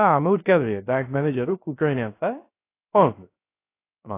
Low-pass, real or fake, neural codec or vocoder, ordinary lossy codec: 3.6 kHz; fake; codec, 16 kHz, 0.3 kbps, FocalCodec; AAC, 24 kbps